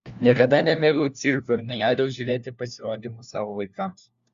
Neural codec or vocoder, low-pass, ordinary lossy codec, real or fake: codec, 16 kHz, 1 kbps, FunCodec, trained on LibriTTS, 50 frames a second; 7.2 kHz; Opus, 64 kbps; fake